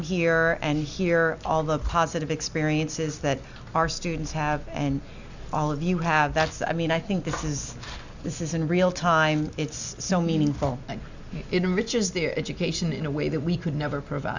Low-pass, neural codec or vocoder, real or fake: 7.2 kHz; none; real